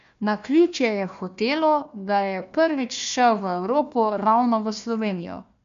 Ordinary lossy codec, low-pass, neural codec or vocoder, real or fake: MP3, 64 kbps; 7.2 kHz; codec, 16 kHz, 1 kbps, FunCodec, trained on Chinese and English, 50 frames a second; fake